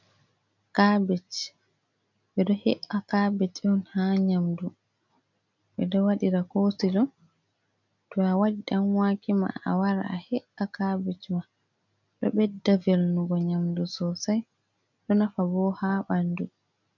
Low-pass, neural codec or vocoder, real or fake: 7.2 kHz; none; real